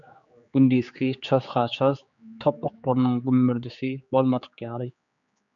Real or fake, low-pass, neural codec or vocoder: fake; 7.2 kHz; codec, 16 kHz, 4 kbps, X-Codec, HuBERT features, trained on general audio